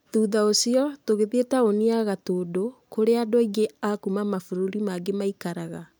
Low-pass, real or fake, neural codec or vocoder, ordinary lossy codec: none; real; none; none